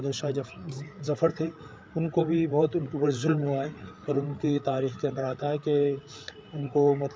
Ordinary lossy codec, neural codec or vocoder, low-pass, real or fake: none; codec, 16 kHz, 8 kbps, FreqCodec, larger model; none; fake